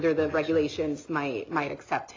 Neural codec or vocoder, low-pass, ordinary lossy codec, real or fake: none; 7.2 kHz; AAC, 32 kbps; real